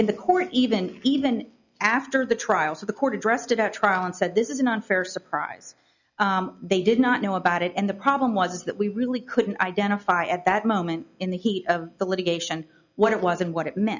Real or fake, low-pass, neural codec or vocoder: real; 7.2 kHz; none